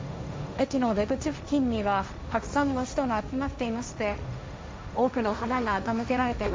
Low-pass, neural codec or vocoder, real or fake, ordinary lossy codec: none; codec, 16 kHz, 1.1 kbps, Voila-Tokenizer; fake; none